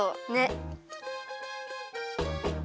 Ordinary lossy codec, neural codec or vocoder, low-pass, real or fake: none; none; none; real